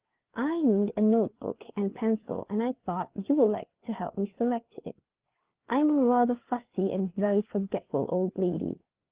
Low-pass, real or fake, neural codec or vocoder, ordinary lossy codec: 3.6 kHz; fake; codec, 16 kHz, 4 kbps, FreqCodec, larger model; Opus, 16 kbps